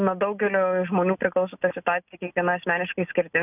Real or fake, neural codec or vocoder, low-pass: real; none; 3.6 kHz